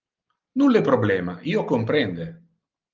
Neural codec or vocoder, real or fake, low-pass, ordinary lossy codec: none; real; 7.2 kHz; Opus, 32 kbps